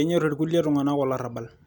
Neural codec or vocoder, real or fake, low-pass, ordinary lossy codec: none; real; 19.8 kHz; none